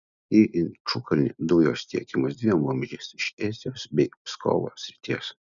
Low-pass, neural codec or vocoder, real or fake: 7.2 kHz; none; real